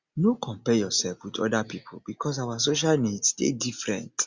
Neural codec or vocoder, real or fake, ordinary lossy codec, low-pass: none; real; Opus, 64 kbps; 7.2 kHz